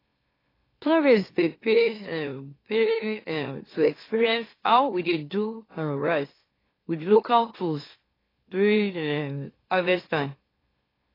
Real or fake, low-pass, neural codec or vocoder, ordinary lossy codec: fake; 5.4 kHz; autoencoder, 44.1 kHz, a latent of 192 numbers a frame, MeloTTS; AAC, 24 kbps